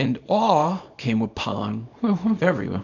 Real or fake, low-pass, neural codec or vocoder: fake; 7.2 kHz; codec, 24 kHz, 0.9 kbps, WavTokenizer, small release